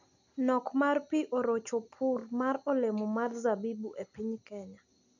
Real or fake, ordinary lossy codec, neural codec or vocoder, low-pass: real; none; none; 7.2 kHz